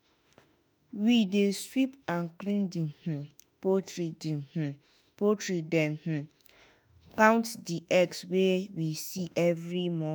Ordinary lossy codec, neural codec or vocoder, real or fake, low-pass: none; autoencoder, 48 kHz, 32 numbers a frame, DAC-VAE, trained on Japanese speech; fake; none